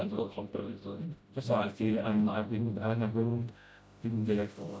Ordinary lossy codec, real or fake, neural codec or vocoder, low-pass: none; fake; codec, 16 kHz, 0.5 kbps, FreqCodec, smaller model; none